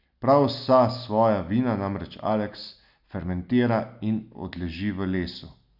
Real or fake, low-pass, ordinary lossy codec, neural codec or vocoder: real; 5.4 kHz; none; none